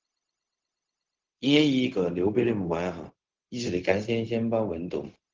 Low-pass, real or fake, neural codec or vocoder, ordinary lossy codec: 7.2 kHz; fake; codec, 16 kHz, 0.4 kbps, LongCat-Audio-Codec; Opus, 16 kbps